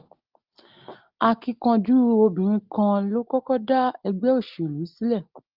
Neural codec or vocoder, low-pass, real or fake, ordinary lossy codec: none; 5.4 kHz; real; Opus, 32 kbps